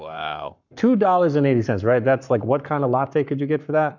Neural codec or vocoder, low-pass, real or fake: codec, 44.1 kHz, 7.8 kbps, Pupu-Codec; 7.2 kHz; fake